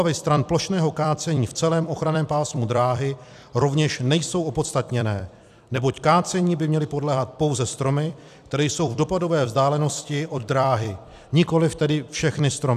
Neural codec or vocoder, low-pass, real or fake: vocoder, 44.1 kHz, 128 mel bands every 256 samples, BigVGAN v2; 14.4 kHz; fake